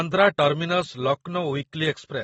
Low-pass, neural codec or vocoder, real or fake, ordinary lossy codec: 14.4 kHz; none; real; AAC, 24 kbps